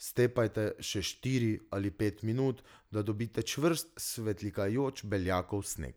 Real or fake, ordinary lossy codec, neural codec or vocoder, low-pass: real; none; none; none